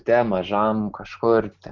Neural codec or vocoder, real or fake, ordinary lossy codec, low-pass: none; real; Opus, 32 kbps; 7.2 kHz